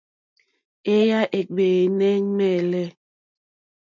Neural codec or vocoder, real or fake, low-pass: none; real; 7.2 kHz